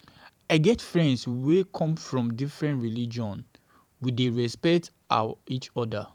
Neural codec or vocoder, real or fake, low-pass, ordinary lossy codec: vocoder, 44.1 kHz, 128 mel bands every 512 samples, BigVGAN v2; fake; 19.8 kHz; none